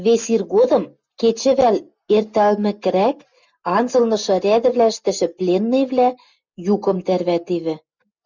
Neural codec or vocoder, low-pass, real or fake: none; 7.2 kHz; real